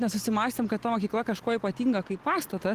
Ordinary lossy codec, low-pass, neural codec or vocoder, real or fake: Opus, 16 kbps; 14.4 kHz; none; real